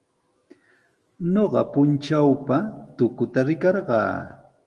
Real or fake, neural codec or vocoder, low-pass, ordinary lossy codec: fake; autoencoder, 48 kHz, 128 numbers a frame, DAC-VAE, trained on Japanese speech; 10.8 kHz; Opus, 24 kbps